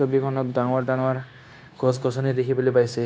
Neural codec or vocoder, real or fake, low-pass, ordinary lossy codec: codec, 16 kHz, 0.9 kbps, LongCat-Audio-Codec; fake; none; none